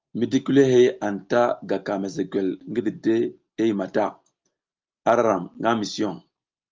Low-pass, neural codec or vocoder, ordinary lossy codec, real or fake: 7.2 kHz; none; Opus, 32 kbps; real